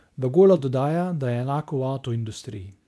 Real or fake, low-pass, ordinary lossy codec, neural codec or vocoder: fake; none; none; codec, 24 kHz, 0.9 kbps, WavTokenizer, medium speech release version 2